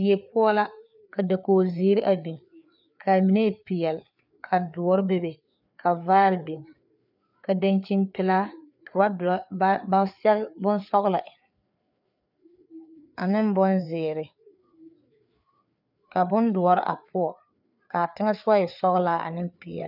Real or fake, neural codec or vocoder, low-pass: fake; codec, 16 kHz, 4 kbps, FreqCodec, larger model; 5.4 kHz